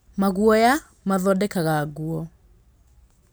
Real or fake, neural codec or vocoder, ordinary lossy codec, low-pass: real; none; none; none